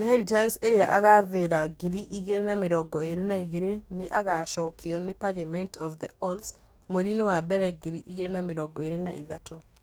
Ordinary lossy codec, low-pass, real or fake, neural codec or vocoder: none; none; fake; codec, 44.1 kHz, 2.6 kbps, DAC